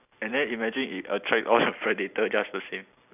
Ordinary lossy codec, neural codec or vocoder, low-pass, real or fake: none; none; 3.6 kHz; real